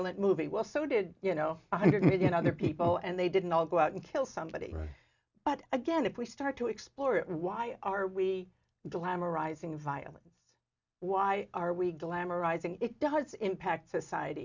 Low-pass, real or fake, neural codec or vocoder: 7.2 kHz; real; none